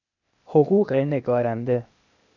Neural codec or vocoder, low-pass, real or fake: codec, 16 kHz, 0.8 kbps, ZipCodec; 7.2 kHz; fake